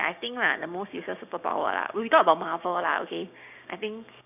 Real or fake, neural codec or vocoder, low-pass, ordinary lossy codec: fake; codec, 16 kHz, 6 kbps, DAC; 3.6 kHz; none